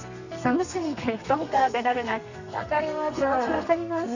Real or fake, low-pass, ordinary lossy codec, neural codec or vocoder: fake; 7.2 kHz; none; codec, 32 kHz, 1.9 kbps, SNAC